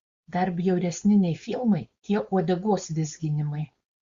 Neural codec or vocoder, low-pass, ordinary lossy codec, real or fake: codec, 16 kHz, 4.8 kbps, FACodec; 7.2 kHz; Opus, 64 kbps; fake